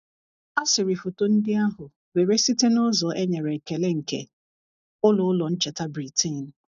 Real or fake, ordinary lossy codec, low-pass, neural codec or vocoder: real; none; 7.2 kHz; none